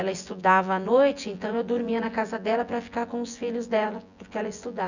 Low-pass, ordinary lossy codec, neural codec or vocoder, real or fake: 7.2 kHz; none; vocoder, 24 kHz, 100 mel bands, Vocos; fake